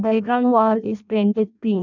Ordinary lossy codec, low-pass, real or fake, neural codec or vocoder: none; 7.2 kHz; fake; codec, 16 kHz in and 24 kHz out, 0.6 kbps, FireRedTTS-2 codec